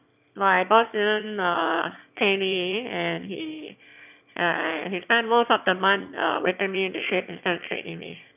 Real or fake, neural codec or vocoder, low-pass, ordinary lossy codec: fake; autoencoder, 22.05 kHz, a latent of 192 numbers a frame, VITS, trained on one speaker; 3.6 kHz; none